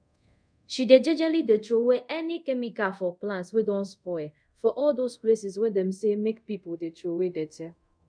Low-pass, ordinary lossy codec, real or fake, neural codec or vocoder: 9.9 kHz; none; fake; codec, 24 kHz, 0.5 kbps, DualCodec